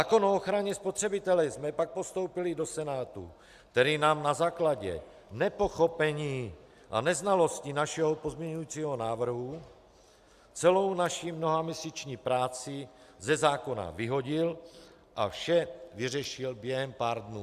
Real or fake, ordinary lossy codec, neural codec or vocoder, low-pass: real; Opus, 64 kbps; none; 14.4 kHz